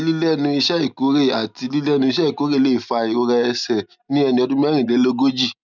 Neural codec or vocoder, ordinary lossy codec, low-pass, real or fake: none; none; 7.2 kHz; real